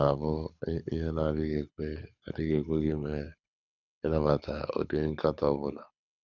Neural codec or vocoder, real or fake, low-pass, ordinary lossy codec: codec, 24 kHz, 6 kbps, HILCodec; fake; 7.2 kHz; none